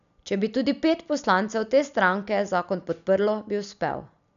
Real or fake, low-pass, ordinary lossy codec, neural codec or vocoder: real; 7.2 kHz; none; none